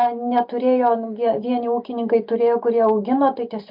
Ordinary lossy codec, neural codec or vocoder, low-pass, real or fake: MP3, 48 kbps; none; 5.4 kHz; real